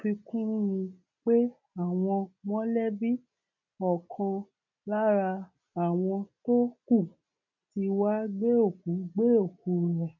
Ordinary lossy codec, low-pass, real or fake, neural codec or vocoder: none; 7.2 kHz; real; none